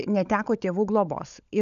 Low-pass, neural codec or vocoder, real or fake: 7.2 kHz; codec, 16 kHz, 16 kbps, FunCodec, trained on LibriTTS, 50 frames a second; fake